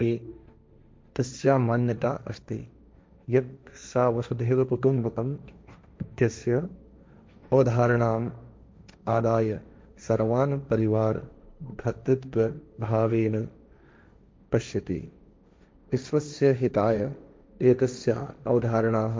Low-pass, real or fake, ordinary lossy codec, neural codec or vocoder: none; fake; none; codec, 16 kHz, 1.1 kbps, Voila-Tokenizer